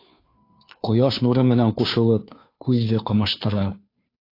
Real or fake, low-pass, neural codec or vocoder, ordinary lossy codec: fake; 5.4 kHz; codec, 16 kHz, 2 kbps, FunCodec, trained on Chinese and English, 25 frames a second; AAC, 32 kbps